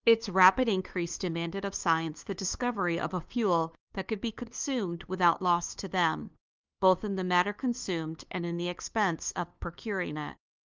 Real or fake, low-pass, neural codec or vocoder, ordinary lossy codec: fake; 7.2 kHz; codec, 16 kHz, 2 kbps, FunCodec, trained on LibriTTS, 25 frames a second; Opus, 24 kbps